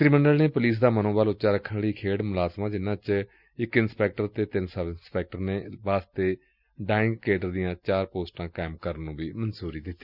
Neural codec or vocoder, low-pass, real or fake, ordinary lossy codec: none; 5.4 kHz; real; Opus, 64 kbps